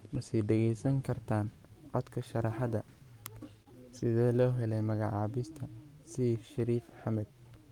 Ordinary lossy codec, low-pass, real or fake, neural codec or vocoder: Opus, 32 kbps; 19.8 kHz; fake; codec, 44.1 kHz, 7.8 kbps, Pupu-Codec